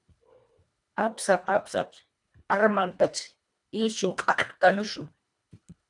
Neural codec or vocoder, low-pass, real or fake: codec, 24 kHz, 1.5 kbps, HILCodec; 10.8 kHz; fake